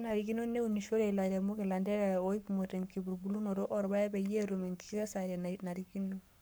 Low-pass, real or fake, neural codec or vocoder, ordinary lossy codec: none; fake; codec, 44.1 kHz, 7.8 kbps, Pupu-Codec; none